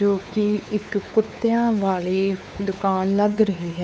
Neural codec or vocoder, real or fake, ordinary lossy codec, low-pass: codec, 16 kHz, 4 kbps, X-Codec, WavLM features, trained on Multilingual LibriSpeech; fake; none; none